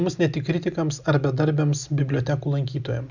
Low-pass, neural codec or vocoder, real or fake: 7.2 kHz; none; real